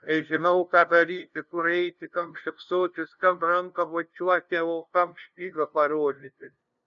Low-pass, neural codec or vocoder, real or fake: 7.2 kHz; codec, 16 kHz, 0.5 kbps, FunCodec, trained on LibriTTS, 25 frames a second; fake